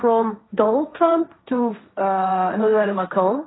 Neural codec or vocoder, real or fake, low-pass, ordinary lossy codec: codec, 16 kHz, 1.1 kbps, Voila-Tokenizer; fake; 7.2 kHz; AAC, 16 kbps